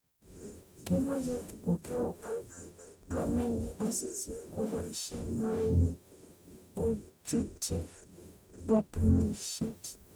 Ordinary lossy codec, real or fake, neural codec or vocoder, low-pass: none; fake; codec, 44.1 kHz, 0.9 kbps, DAC; none